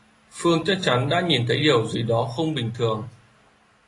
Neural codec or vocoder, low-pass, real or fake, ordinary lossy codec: none; 10.8 kHz; real; AAC, 32 kbps